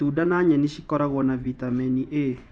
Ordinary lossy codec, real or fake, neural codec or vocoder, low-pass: none; fake; vocoder, 24 kHz, 100 mel bands, Vocos; 9.9 kHz